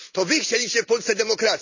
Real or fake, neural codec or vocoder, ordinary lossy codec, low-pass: real; none; none; 7.2 kHz